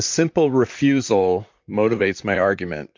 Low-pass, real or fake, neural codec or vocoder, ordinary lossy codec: 7.2 kHz; fake; vocoder, 44.1 kHz, 128 mel bands, Pupu-Vocoder; MP3, 48 kbps